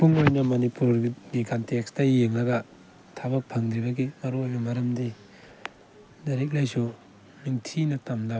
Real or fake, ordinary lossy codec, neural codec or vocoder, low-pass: real; none; none; none